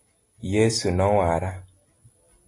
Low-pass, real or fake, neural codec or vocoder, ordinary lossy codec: 10.8 kHz; real; none; AAC, 32 kbps